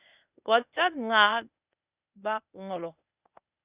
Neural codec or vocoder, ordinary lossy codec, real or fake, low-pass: codec, 16 kHz, 0.8 kbps, ZipCodec; Opus, 64 kbps; fake; 3.6 kHz